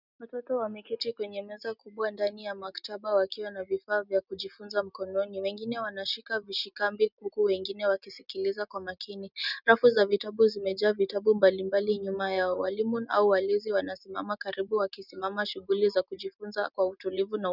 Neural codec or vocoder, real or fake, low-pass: none; real; 5.4 kHz